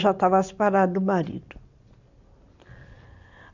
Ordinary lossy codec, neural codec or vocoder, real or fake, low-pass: none; none; real; 7.2 kHz